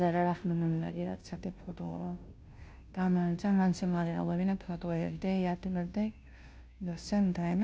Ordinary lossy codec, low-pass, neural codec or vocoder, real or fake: none; none; codec, 16 kHz, 0.5 kbps, FunCodec, trained on Chinese and English, 25 frames a second; fake